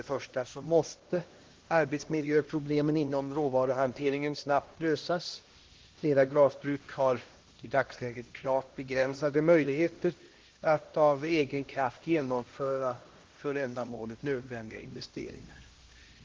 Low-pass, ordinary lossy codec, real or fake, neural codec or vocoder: 7.2 kHz; Opus, 16 kbps; fake; codec, 16 kHz, 1 kbps, X-Codec, HuBERT features, trained on LibriSpeech